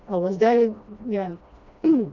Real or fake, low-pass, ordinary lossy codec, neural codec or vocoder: fake; 7.2 kHz; none; codec, 16 kHz, 1 kbps, FreqCodec, smaller model